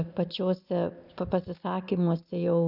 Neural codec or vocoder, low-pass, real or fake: none; 5.4 kHz; real